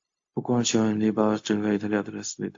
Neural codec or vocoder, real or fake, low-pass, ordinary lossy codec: codec, 16 kHz, 0.4 kbps, LongCat-Audio-Codec; fake; 7.2 kHz; AAC, 48 kbps